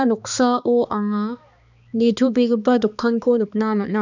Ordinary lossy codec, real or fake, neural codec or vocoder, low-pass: none; fake; codec, 16 kHz, 2 kbps, X-Codec, HuBERT features, trained on balanced general audio; 7.2 kHz